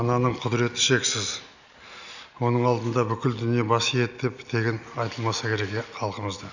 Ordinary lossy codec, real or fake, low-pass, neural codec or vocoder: none; real; 7.2 kHz; none